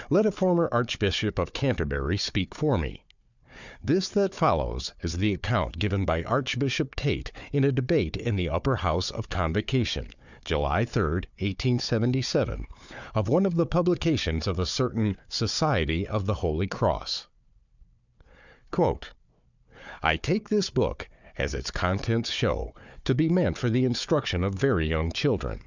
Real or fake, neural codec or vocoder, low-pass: fake; codec, 16 kHz, 4 kbps, FunCodec, trained on Chinese and English, 50 frames a second; 7.2 kHz